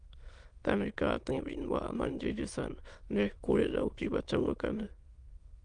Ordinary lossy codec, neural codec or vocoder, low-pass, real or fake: Opus, 32 kbps; autoencoder, 22.05 kHz, a latent of 192 numbers a frame, VITS, trained on many speakers; 9.9 kHz; fake